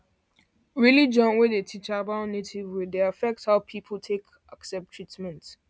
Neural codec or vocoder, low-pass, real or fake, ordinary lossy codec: none; none; real; none